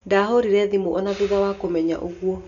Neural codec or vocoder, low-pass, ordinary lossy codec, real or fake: none; 7.2 kHz; none; real